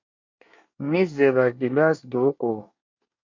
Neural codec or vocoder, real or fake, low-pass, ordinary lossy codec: codec, 24 kHz, 1 kbps, SNAC; fake; 7.2 kHz; MP3, 48 kbps